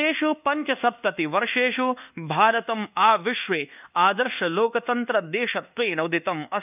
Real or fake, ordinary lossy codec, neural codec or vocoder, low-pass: fake; none; codec, 24 kHz, 1.2 kbps, DualCodec; 3.6 kHz